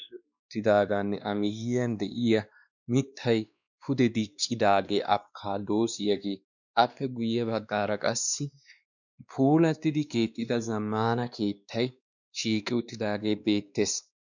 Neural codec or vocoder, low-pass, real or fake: codec, 16 kHz, 2 kbps, X-Codec, WavLM features, trained on Multilingual LibriSpeech; 7.2 kHz; fake